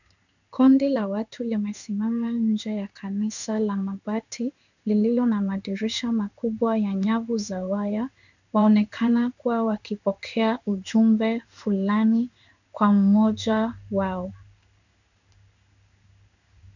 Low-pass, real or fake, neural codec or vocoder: 7.2 kHz; fake; codec, 16 kHz in and 24 kHz out, 1 kbps, XY-Tokenizer